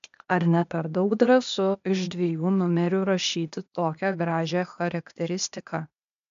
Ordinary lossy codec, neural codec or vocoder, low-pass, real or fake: MP3, 64 kbps; codec, 16 kHz, 0.8 kbps, ZipCodec; 7.2 kHz; fake